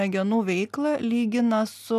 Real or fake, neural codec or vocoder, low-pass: real; none; 14.4 kHz